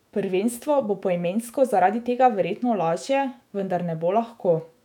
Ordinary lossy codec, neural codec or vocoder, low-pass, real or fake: none; autoencoder, 48 kHz, 128 numbers a frame, DAC-VAE, trained on Japanese speech; 19.8 kHz; fake